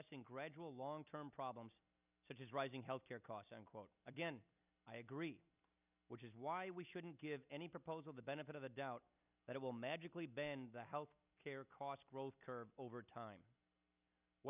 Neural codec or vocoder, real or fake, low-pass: none; real; 3.6 kHz